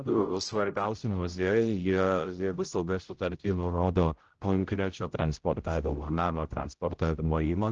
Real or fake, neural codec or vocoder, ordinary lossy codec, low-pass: fake; codec, 16 kHz, 0.5 kbps, X-Codec, HuBERT features, trained on general audio; Opus, 16 kbps; 7.2 kHz